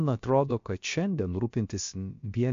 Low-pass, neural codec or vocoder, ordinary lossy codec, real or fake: 7.2 kHz; codec, 16 kHz, about 1 kbps, DyCAST, with the encoder's durations; MP3, 48 kbps; fake